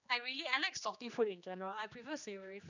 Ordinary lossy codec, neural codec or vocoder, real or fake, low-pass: none; codec, 16 kHz, 2 kbps, X-Codec, HuBERT features, trained on general audio; fake; 7.2 kHz